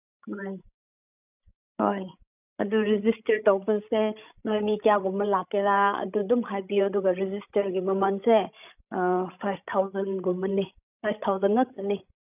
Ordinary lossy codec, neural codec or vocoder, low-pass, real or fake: none; codec, 16 kHz, 16 kbps, FreqCodec, larger model; 3.6 kHz; fake